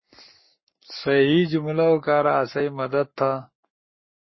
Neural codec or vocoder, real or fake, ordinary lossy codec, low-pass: codec, 44.1 kHz, 7.8 kbps, Pupu-Codec; fake; MP3, 24 kbps; 7.2 kHz